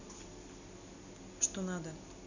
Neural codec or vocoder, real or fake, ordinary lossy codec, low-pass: none; real; none; 7.2 kHz